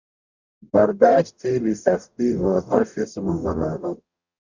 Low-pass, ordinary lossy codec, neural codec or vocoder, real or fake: 7.2 kHz; Opus, 64 kbps; codec, 44.1 kHz, 0.9 kbps, DAC; fake